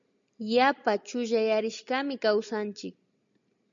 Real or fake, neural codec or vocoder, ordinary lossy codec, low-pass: real; none; MP3, 64 kbps; 7.2 kHz